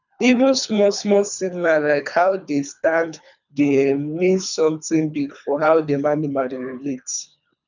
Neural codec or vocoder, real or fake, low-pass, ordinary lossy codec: codec, 24 kHz, 3 kbps, HILCodec; fake; 7.2 kHz; none